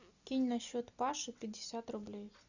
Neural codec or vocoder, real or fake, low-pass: none; real; 7.2 kHz